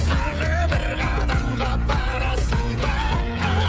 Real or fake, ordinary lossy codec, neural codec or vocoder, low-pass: fake; none; codec, 16 kHz, 16 kbps, FreqCodec, smaller model; none